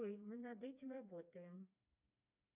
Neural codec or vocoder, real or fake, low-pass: codec, 16 kHz, 2 kbps, FreqCodec, smaller model; fake; 3.6 kHz